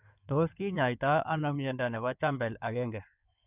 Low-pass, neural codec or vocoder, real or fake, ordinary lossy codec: 3.6 kHz; codec, 16 kHz in and 24 kHz out, 2.2 kbps, FireRedTTS-2 codec; fake; none